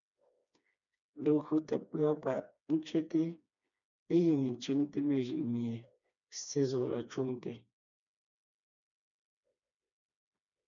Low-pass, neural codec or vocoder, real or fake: 7.2 kHz; codec, 16 kHz, 2 kbps, FreqCodec, smaller model; fake